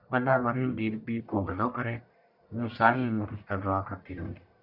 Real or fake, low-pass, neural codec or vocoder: fake; 5.4 kHz; codec, 44.1 kHz, 1.7 kbps, Pupu-Codec